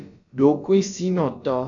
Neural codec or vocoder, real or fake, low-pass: codec, 16 kHz, about 1 kbps, DyCAST, with the encoder's durations; fake; 7.2 kHz